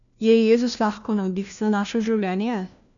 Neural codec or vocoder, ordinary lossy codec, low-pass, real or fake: codec, 16 kHz, 1 kbps, FunCodec, trained on LibriTTS, 50 frames a second; none; 7.2 kHz; fake